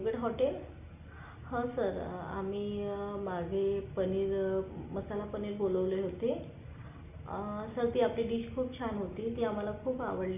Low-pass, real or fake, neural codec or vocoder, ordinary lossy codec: 3.6 kHz; real; none; none